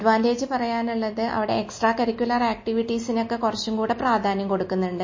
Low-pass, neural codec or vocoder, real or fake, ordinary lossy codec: 7.2 kHz; none; real; MP3, 32 kbps